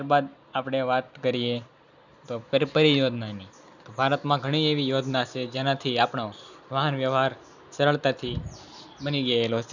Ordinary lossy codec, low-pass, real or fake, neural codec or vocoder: none; 7.2 kHz; real; none